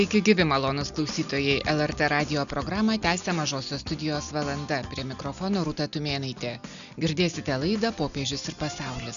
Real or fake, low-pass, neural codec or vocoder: real; 7.2 kHz; none